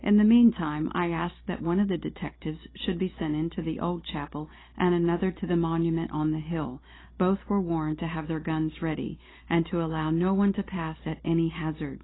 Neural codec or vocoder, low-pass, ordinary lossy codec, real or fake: none; 7.2 kHz; AAC, 16 kbps; real